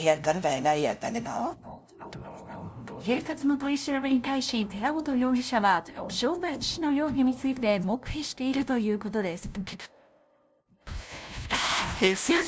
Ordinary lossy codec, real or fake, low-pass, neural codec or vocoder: none; fake; none; codec, 16 kHz, 0.5 kbps, FunCodec, trained on LibriTTS, 25 frames a second